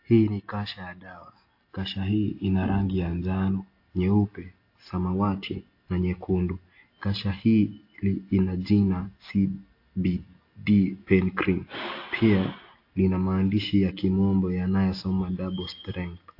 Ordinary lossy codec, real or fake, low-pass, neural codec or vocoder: AAC, 32 kbps; real; 5.4 kHz; none